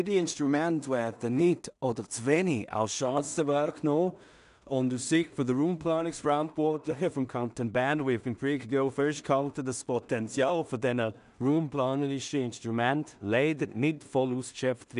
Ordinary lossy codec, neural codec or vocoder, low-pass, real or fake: none; codec, 16 kHz in and 24 kHz out, 0.4 kbps, LongCat-Audio-Codec, two codebook decoder; 10.8 kHz; fake